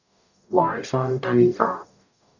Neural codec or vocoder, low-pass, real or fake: codec, 44.1 kHz, 0.9 kbps, DAC; 7.2 kHz; fake